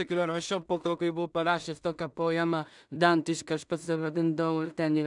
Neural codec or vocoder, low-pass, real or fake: codec, 16 kHz in and 24 kHz out, 0.4 kbps, LongCat-Audio-Codec, two codebook decoder; 10.8 kHz; fake